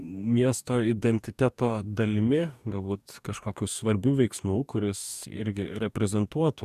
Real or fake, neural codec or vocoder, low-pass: fake; codec, 44.1 kHz, 2.6 kbps, DAC; 14.4 kHz